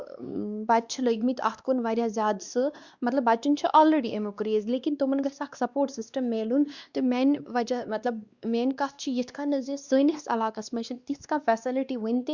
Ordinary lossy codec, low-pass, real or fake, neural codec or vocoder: Opus, 64 kbps; 7.2 kHz; fake; codec, 16 kHz, 2 kbps, X-Codec, WavLM features, trained on Multilingual LibriSpeech